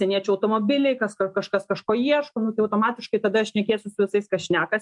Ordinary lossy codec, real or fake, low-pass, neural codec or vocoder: MP3, 64 kbps; real; 10.8 kHz; none